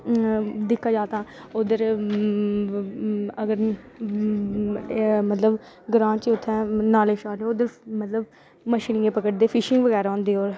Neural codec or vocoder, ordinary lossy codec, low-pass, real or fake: none; none; none; real